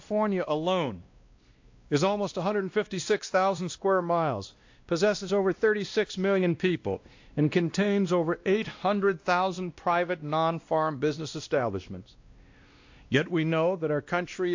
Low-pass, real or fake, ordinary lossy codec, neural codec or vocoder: 7.2 kHz; fake; AAC, 48 kbps; codec, 16 kHz, 1 kbps, X-Codec, WavLM features, trained on Multilingual LibriSpeech